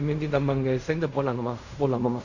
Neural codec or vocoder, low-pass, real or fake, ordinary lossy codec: codec, 16 kHz in and 24 kHz out, 0.4 kbps, LongCat-Audio-Codec, fine tuned four codebook decoder; 7.2 kHz; fake; none